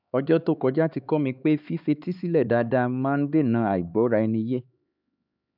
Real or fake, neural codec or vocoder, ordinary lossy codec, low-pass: fake; codec, 16 kHz, 4 kbps, X-Codec, HuBERT features, trained on LibriSpeech; none; 5.4 kHz